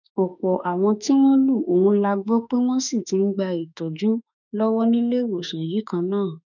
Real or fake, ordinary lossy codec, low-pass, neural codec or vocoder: fake; none; 7.2 kHz; autoencoder, 48 kHz, 32 numbers a frame, DAC-VAE, trained on Japanese speech